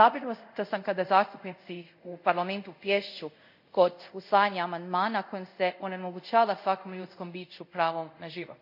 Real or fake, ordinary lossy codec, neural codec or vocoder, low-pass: fake; none; codec, 24 kHz, 0.5 kbps, DualCodec; 5.4 kHz